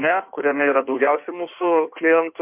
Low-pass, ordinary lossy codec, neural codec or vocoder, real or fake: 3.6 kHz; MP3, 32 kbps; codec, 16 kHz in and 24 kHz out, 1.1 kbps, FireRedTTS-2 codec; fake